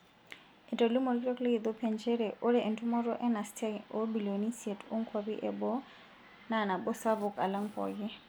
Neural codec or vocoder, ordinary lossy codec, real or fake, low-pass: none; none; real; none